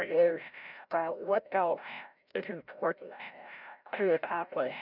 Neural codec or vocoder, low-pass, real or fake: codec, 16 kHz, 0.5 kbps, FreqCodec, larger model; 5.4 kHz; fake